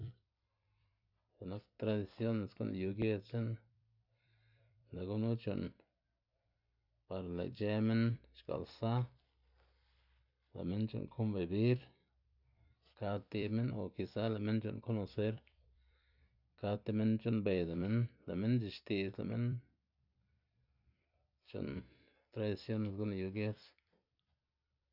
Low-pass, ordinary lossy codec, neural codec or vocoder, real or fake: 5.4 kHz; none; vocoder, 44.1 kHz, 128 mel bands every 256 samples, BigVGAN v2; fake